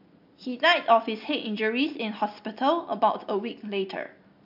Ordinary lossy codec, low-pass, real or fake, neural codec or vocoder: MP3, 48 kbps; 5.4 kHz; real; none